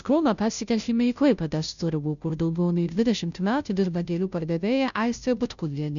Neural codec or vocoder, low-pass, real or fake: codec, 16 kHz, 0.5 kbps, FunCodec, trained on Chinese and English, 25 frames a second; 7.2 kHz; fake